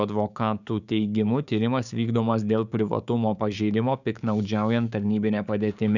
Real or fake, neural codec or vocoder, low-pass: fake; codec, 16 kHz, 6 kbps, DAC; 7.2 kHz